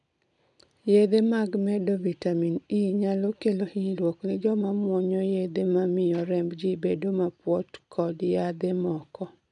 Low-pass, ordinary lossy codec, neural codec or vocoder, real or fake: 10.8 kHz; none; none; real